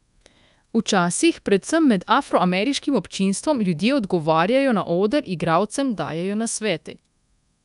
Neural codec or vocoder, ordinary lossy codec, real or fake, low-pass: codec, 24 kHz, 1.2 kbps, DualCodec; none; fake; 10.8 kHz